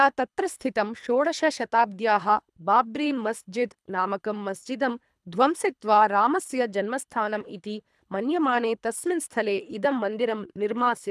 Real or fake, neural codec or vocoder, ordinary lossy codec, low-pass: fake; codec, 24 kHz, 3 kbps, HILCodec; none; none